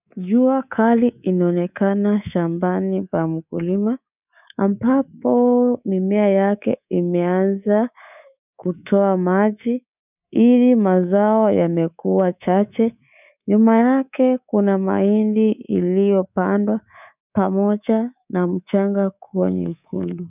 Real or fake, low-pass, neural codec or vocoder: fake; 3.6 kHz; codec, 24 kHz, 3.1 kbps, DualCodec